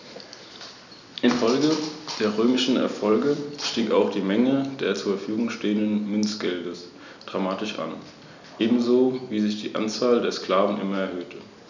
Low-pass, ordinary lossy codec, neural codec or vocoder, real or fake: 7.2 kHz; none; none; real